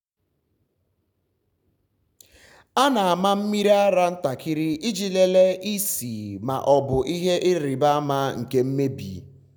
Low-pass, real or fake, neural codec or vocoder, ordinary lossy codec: 19.8 kHz; real; none; none